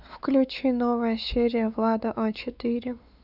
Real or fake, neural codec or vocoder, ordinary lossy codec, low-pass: fake; codec, 16 kHz, 4 kbps, X-Codec, WavLM features, trained on Multilingual LibriSpeech; none; 5.4 kHz